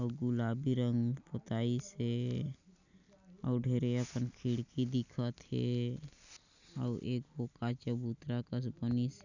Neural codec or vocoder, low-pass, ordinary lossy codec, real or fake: none; 7.2 kHz; none; real